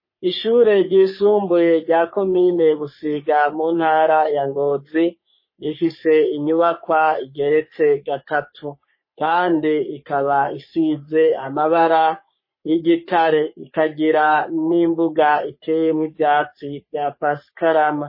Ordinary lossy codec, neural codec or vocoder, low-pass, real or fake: MP3, 24 kbps; codec, 44.1 kHz, 3.4 kbps, Pupu-Codec; 5.4 kHz; fake